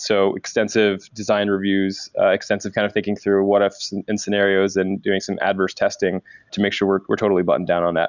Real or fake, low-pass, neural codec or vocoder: real; 7.2 kHz; none